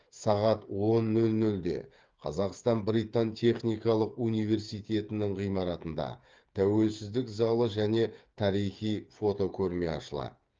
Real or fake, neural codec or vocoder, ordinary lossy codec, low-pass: fake; codec, 16 kHz, 8 kbps, FreqCodec, smaller model; Opus, 24 kbps; 7.2 kHz